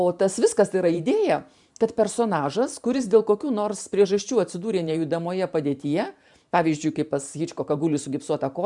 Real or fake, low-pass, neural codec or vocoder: fake; 10.8 kHz; vocoder, 44.1 kHz, 128 mel bands every 512 samples, BigVGAN v2